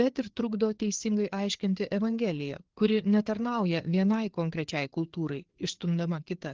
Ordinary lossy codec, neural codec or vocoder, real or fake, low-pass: Opus, 16 kbps; codec, 16 kHz, 4 kbps, FreqCodec, larger model; fake; 7.2 kHz